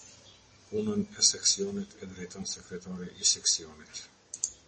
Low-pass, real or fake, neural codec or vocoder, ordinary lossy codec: 10.8 kHz; real; none; MP3, 32 kbps